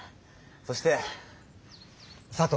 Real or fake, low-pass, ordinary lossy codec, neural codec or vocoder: real; none; none; none